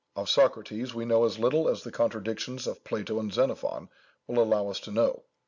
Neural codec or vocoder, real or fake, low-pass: none; real; 7.2 kHz